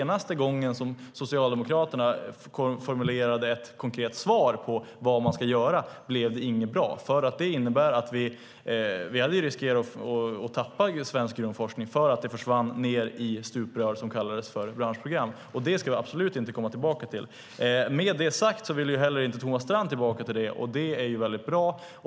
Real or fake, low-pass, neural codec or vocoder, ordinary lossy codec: real; none; none; none